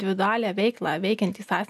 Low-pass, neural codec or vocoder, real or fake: 14.4 kHz; none; real